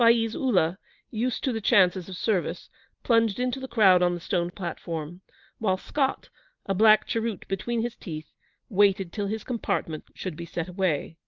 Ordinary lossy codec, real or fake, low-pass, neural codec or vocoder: Opus, 32 kbps; real; 7.2 kHz; none